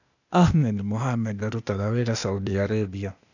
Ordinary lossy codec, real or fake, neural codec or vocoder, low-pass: none; fake; codec, 16 kHz, 0.8 kbps, ZipCodec; 7.2 kHz